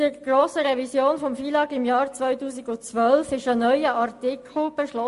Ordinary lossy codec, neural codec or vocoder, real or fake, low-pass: MP3, 48 kbps; none; real; 14.4 kHz